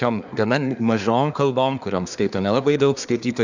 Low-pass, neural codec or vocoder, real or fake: 7.2 kHz; codec, 24 kHz, 1 kbps, SNAC; fake